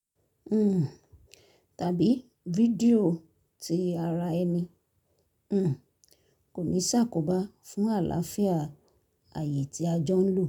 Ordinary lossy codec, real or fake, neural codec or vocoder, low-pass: none; real; none; 19.8 kHz